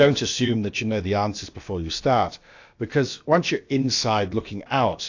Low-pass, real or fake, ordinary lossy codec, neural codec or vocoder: 7.2 kHz; fake; AAC, 48 kbps; codec, 16 kHz, about 1 kbps, DyCAST, with the encoder's durations